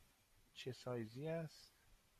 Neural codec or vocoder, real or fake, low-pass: none; real; 14.4 kHz